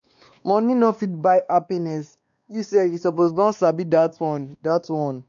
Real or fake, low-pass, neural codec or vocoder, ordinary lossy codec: fake; 7.2 kHz; codec, 16 kHz, 2 kbps, X-Codec, WavLM features, trained on Multilingual LibriSpeech; none